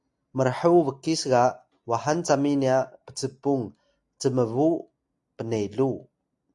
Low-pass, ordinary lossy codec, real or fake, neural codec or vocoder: 10.8 kHz; AAC, 64 kbps; real; none